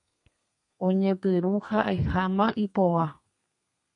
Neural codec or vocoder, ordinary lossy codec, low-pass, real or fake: codec, 32 kHz, 1.9 kbps, SNAC; MP3, 64 kbps; 10.8 kHz; fake